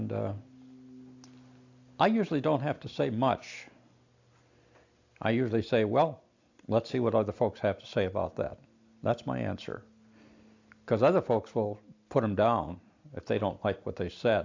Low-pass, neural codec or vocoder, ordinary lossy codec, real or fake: 7.2 kHz; none; AAC, 48 kbps; real